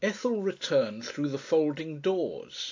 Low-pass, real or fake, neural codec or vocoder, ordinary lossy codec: 7.2 kHz; real; none; AAC, 48 kbps